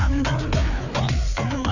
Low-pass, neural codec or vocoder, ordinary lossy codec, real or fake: 7.2 kHz; codec, 16 kHz, 4 kbps, FreqCodec, larger model; none; fake